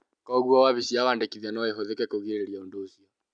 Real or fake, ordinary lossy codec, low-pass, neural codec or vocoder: real; none; 9.9 kHz; none